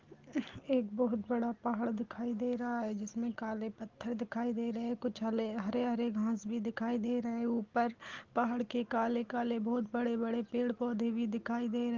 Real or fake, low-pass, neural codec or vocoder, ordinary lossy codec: real; 7.2 kHz; none; Opus, 16 kbps